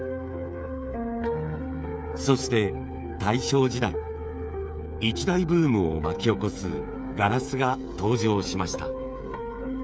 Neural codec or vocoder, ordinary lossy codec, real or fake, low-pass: codec, 16 kHz, 8 kbps, FreqCodec, smaller model; none; fake; none